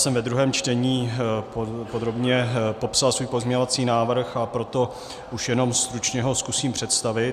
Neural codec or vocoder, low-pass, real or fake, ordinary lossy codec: none; 14.4 kHz; real; Opus, 64 kbps